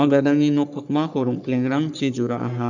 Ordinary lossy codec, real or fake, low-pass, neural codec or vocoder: none; fake; 7.2 kHz; codec, 44.1 kHz, 3.4 kbps, Pupu-Codec